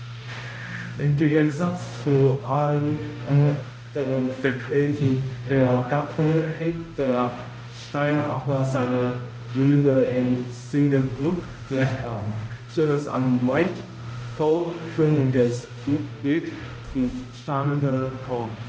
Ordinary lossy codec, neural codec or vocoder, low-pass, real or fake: none; codec, 16 kHz, 0.5 kbps, X-Codec, HuBERT features, trained on balanced general audio; none; fake